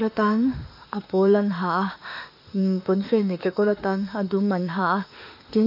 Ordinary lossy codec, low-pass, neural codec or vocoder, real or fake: none; 5.4 kHz; autoencoder, 48 kHz, 32 numbers a frame, DAC-VAE, trained on Japanese speech; fake